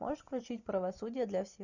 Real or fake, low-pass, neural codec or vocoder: real; 7.2 kHz; none